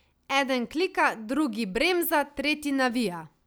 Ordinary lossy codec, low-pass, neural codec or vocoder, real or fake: none; none; none; real